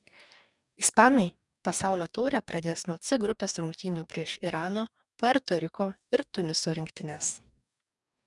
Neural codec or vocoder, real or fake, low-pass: codec, 44.1 kHz, 2.6 kbps, DAC; fake; 10.8 kHz